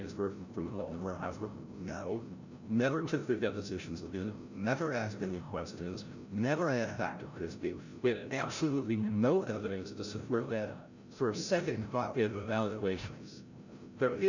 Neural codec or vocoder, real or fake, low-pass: codec, 16 kHz, 0.5 kbps, FreqCodec, larger model; fake; 7.2 kHz